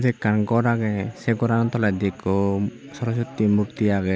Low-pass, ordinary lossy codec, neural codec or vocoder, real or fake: none; none; none; real